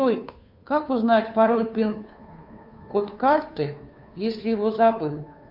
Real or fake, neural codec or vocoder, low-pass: fake; codec, 16 kHz, 2 kbps, FunCodec, trained on Chinese and English, 25 frames a second; 5.4 kHz